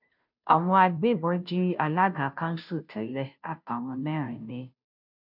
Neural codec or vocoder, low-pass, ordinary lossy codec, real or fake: codec, 16 kHz, 0.5 kbps, FunCodec, trained on Chinese and English, 25 frames a second; 5.4 kHz; none; fake